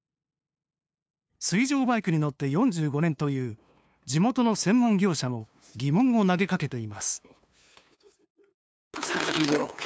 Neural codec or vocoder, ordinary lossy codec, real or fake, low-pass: codec, 16 kHz, 2 kbps, FunCodec, trained on LibriTTS, 25 frames a second; none; fake; none